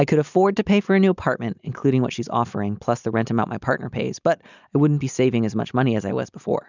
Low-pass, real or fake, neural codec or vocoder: 7.2 kHz; real; none